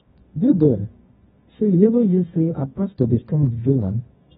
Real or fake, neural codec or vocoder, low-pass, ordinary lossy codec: fake; codec, 24 kHz, 0.9 kbps, WavTokenizer, medium music audio release; 10.8 kHz; AAC, 16 kbps